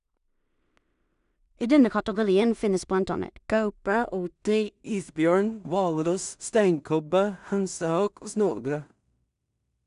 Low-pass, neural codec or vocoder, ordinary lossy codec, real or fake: 10.8 kHz; codec, 16 kHz in and 24 kHz out, 0.4 kbps, LongCat-Audio-Codec, two codebook decoder; none; fake